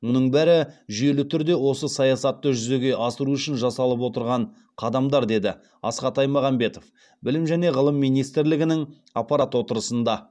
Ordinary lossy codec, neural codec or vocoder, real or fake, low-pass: none; none; real; none